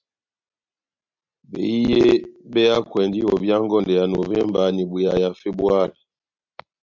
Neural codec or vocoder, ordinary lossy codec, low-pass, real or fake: none; MP3, 64 kbps; 7.2 kHz; real